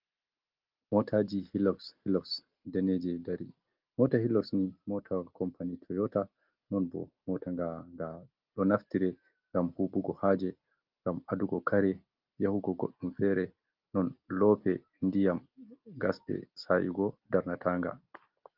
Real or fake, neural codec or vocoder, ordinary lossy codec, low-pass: real; none; Opus, 32 kbps; 5.4 kHz